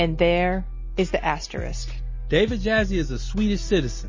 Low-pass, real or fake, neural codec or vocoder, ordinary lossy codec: 7.2 kHz; real; none; MP3, 32 kbps